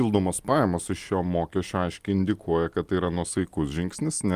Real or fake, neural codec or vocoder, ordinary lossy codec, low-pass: real; none; Opus, 32 kbps; 14.4 kHz